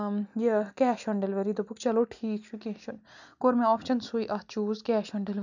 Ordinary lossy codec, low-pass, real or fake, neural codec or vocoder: none; 7.2 kHz; real; none